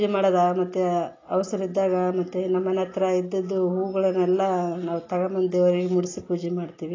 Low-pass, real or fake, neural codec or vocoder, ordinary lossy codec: 7.2 kHz; real; none; AAC, 32 kbps